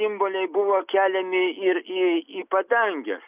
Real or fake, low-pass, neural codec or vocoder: real; 3.6 kHz; none